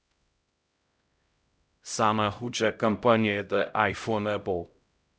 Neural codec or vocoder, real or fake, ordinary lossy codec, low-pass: codec, 16 kHz, 0.5 kbps, X-Codec, HuBERT features, trained on LibriSpeech; fake; none; none